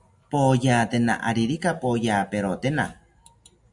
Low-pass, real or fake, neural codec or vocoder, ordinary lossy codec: 10.8 kHz; real; none; MP3, 96 kbps